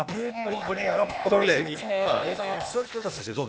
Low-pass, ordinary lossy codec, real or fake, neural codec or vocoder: none; none; fake; codec, 16 kHz, 0.8 kbps, ZipCodec